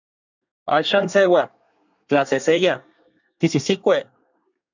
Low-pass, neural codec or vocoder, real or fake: 7.2 kHz; codec, 24 kHz, 1 kbps, SNAC; fake